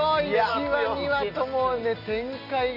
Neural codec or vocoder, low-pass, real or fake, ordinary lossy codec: none; 5.4 kHz; real; none